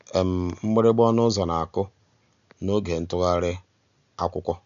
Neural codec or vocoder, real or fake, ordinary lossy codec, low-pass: none; real; none; 7.2 kHz